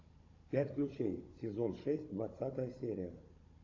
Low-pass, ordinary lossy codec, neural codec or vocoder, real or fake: 7.2 kHz; AAC, 32 kbps; codec, 16 kHz, 16 kbps, FunCodec, trained on Chinese and English, 50 frames a second; fake